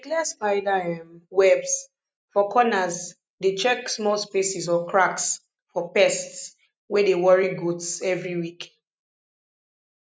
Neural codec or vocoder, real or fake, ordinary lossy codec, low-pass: none; real; none; none